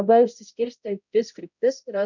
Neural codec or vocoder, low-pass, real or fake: codec, 16 kHz, 0.5 kbps, X-Codec, HuBERT features, trained on balanced general audio; 7.2 kHz; fake